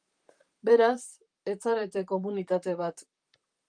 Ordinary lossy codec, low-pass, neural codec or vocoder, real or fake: Opus, 32 kbps; 9.9 kHz; vocoder, 44.1 kHz, 128 mel bands, Pupu-Vocoder; fake